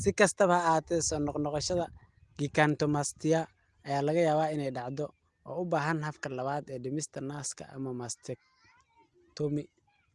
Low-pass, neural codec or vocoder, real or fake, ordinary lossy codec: 10.8 kHz; none; real; Opus, 24 kbps